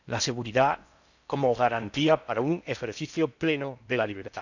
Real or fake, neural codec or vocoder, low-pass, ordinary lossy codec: fake; codec, 16 kHz in and 24 kHz out, 0.6 kbps, FocalCodec, streaming, 4096 codes; 7.2 kHz; none